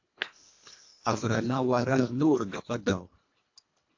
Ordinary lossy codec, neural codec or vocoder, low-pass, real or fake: AAC, 48 kbps; codec, 24 kHz, 1.5 kbps, HILCodec; 7.2 kHz; fake